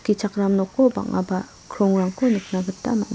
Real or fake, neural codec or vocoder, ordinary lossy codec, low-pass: real; none; none; none